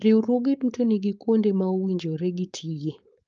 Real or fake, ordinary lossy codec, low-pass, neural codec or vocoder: fake; Opus, 24 kbps; 7.2 kHz; codec, 16 kHz, 4.8 kbps, FACodec